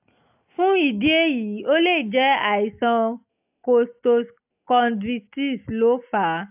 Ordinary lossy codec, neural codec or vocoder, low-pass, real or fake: AAC, 32 kbps; none; 3.6 kHz; real